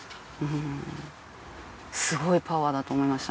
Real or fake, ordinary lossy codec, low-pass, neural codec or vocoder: real; none; none; none